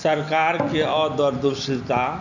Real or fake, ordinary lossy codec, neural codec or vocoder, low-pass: real; none; none; 7.2 kHz